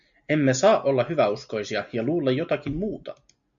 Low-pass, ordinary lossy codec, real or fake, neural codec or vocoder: 7.2 kHz; MP3, 96 kbps; real; none